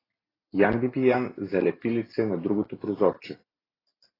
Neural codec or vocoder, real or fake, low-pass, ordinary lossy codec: none; real; 5.4 kHz; AAC, 24 kbps